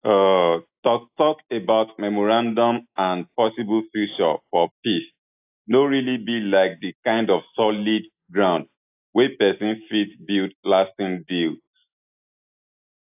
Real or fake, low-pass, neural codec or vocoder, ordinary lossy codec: real; 3.6 kHz; none; AAC, 32 kbps